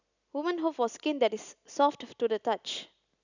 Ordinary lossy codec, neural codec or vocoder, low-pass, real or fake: none; none; 7.2 kHz; real